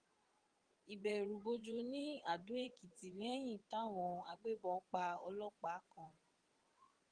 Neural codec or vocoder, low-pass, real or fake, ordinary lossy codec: vocoder, 22.05 kHz, 80 mel bands, WaveNeXt; 9.9 kHz; fake; Opus, 24 kbps